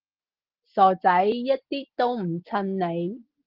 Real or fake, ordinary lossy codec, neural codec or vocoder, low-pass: real; Opus, 16 kbps; none; 5.4 kHz